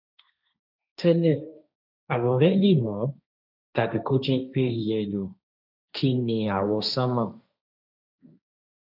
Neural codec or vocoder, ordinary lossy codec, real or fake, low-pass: codec, 16 kHz, 1.1 kbps, Voila-Tokenizer; none; fake; 5.4 kHz